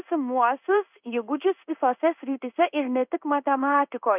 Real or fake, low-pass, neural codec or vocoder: fake; 3.6 kHz; codec, 16 kHz in and 24 kHz out, 1 kbps, XY-Tokenizer